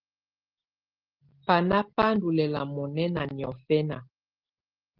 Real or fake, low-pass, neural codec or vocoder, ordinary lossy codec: real; 5.4 kHz; none; Opus, 16 kbps